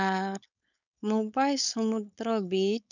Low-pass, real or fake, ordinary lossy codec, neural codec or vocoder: 7.2 kHz; fake; none; codec, 16 kHz, 4.8 kbps, FACodec